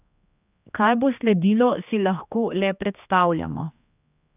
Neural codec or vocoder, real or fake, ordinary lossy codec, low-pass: codec, 16 kHz, 2 kbps, X-Codec, HuBERT features, trained on general audio; fake; none; 3.6 kHz